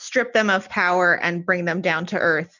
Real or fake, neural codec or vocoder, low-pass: real; none; 7.2 kHz